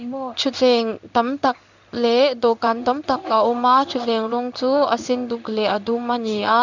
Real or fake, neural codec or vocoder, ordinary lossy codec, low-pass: fake; codec, 16 kHz in and 24 kHz out, 1 kbps, XY-Tokenizer; none; 7.2 kHz